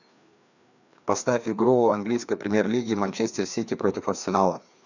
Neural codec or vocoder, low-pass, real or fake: codec, 16 kHz, 2 kbps, FreqCodec, larger model; 7.2 kHz; fake